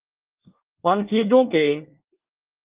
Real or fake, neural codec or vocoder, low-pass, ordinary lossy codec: fake; codec, 24 kHz, 1 kbps, SNAC; 3.6 kHz; Opus, 24 kbps